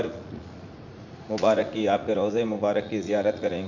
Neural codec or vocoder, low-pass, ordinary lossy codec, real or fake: vocoder, 44.1 kHz, 80 mel bands, Vocos; 7.2 kHz; none; fake